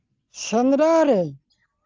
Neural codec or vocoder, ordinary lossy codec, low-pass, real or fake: none; Opus, 32 kbps; 7.2 kHz; real